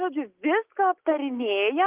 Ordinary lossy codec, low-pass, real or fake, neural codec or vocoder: Opus, 32 kbps; 3.6 kHz; real; none